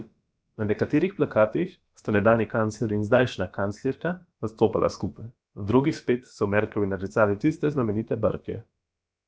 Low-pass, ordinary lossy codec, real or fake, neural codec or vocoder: none; none; fake; codec, 16 kHz, about 1 kbps, DyCAST, with the encoder's durations